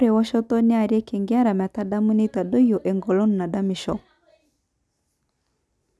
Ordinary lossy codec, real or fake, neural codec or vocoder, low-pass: none; real; none; none